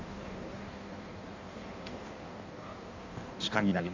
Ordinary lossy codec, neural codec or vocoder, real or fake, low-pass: MP3, 64 kbps; codec, 16 kHz in and 24 kHz out, 1.1 kbps, FireRedTTS-2 codec; fake; 7.2 kHz